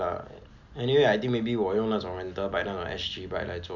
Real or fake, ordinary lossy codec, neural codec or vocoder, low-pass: real; none; none; 7.2 kHz